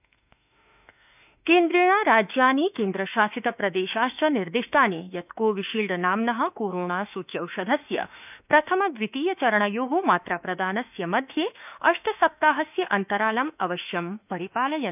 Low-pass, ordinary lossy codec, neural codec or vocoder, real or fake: 3.6 kHz; none; autoencoder, 48 kHz, 32 numbers a frame, DAC-VAE, trained on Japanese speech; fake